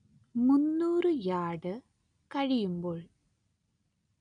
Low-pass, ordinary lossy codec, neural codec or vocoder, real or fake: 9.9 kHz; none; none; real